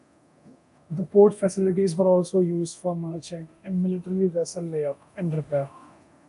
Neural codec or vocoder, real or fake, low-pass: codec, 24 kHz, 0.9 kbps, DualCodec; fake; 10.8 kHz